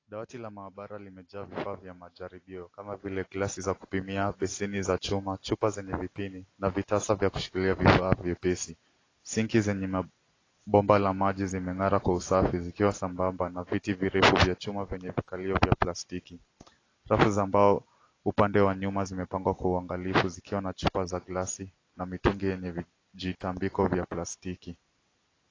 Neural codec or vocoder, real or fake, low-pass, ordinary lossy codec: none; real; 7.2 kHz; AAC, 32 kbps